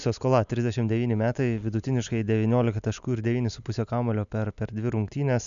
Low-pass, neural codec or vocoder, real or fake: 7.2 kHz; none; real